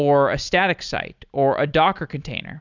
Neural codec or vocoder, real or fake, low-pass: none; real; 7.2 kHz